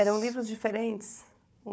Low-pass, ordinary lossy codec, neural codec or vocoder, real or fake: none; none; codec, 16 kHz, 4 kbps, FunCodec, trained on Chinese and English, 50 frames a second; fake